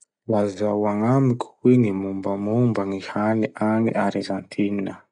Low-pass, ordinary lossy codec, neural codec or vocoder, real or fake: 9.9 kHz; none; none; real